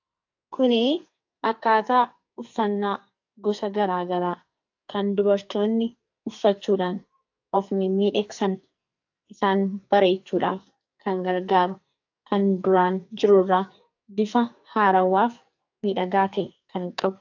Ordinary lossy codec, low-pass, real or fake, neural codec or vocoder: AAC, 48 kbps; 7.2 kHz; fake; codec, 44.1 kHz, 2.6 kbps, SNAC